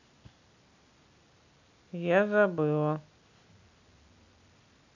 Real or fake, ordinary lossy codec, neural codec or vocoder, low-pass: real; none; none; 7.2 kHz